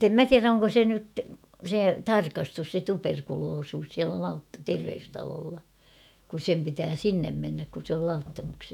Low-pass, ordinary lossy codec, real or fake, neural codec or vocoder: 19.8 kHz; none; fake; autoencoder, 48 kHz, 128 numbers a frame, DAC-VAE, trained on Japanese speech